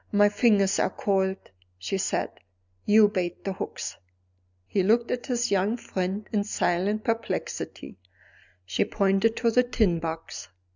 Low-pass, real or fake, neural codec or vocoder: 7.2 kHz; real; none